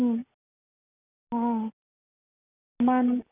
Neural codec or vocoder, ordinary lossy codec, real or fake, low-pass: none; none; real; 3.6 kHz